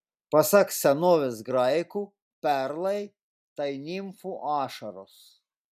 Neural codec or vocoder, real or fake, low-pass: none; real; 14.4 kHz